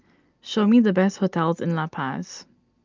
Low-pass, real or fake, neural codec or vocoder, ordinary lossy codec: 7.2 kHz; real; none; Opus, 24 kbps